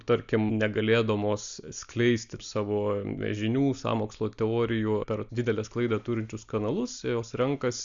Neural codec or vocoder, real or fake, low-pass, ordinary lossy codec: none; real; 7.2 kHz; Opus, 64 kbps